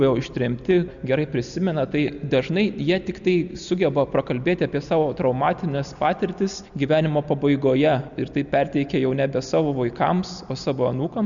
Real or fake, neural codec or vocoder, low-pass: real; none; 7.2 kHz